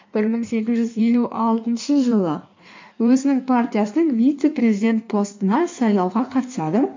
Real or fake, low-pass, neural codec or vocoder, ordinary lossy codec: fake; 7.2 kHz; codec, 16 kHz in and 24 kHz out, 1.1 kbps, FireRedTTS-2 codec; MP3, 48 kbps